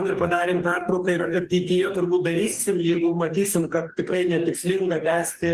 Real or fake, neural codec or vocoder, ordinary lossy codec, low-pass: fake; codec, 44.1 kHz, 2.6 kbps, DAC; Opus, 24 kbps; 14.4 kHz